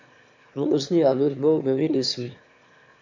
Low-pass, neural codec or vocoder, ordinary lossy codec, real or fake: 7.2 kHz; autoencoder, 22.05 kHz, a latent of 192 numbers a frame, VITS, trained on one speaker; MP3, 48 kbps; fake